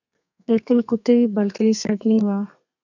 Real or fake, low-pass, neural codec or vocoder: fake; 7.2 kHz; codec, 32 kHz, 1.9 kbps, SNAC